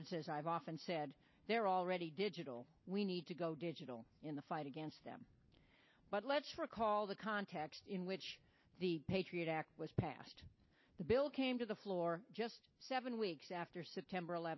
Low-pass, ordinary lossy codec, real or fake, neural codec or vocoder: 7.2 kHz; MP3, 24 kbps; real; none